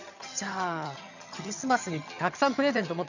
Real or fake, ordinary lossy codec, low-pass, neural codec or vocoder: fake; none; 7.2 kHz; vocoder, 22.05 kHz, 80 mel bands, HiFi-GAN